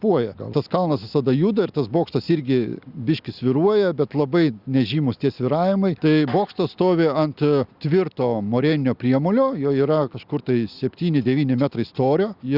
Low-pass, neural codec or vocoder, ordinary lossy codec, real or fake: 5.4 kHz; none; Opus, 64 kbps; real